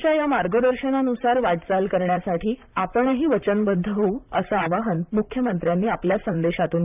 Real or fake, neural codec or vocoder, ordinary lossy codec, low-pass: fake; vocoder, 44.1 kHz, 128 mel bands, Pupu-Vocoder; none; 3.6 kHz